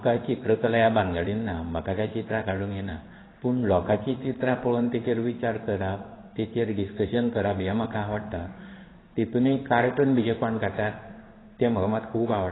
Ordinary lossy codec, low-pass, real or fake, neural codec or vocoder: AAC, 16 kbps; 7.2 kHz; fake; codec, 16 kHz in and 24 kHz out, 1 kbps, XY-Tokenizer